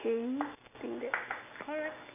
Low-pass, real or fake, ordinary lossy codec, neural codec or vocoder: 3.6 kHz; real; none; none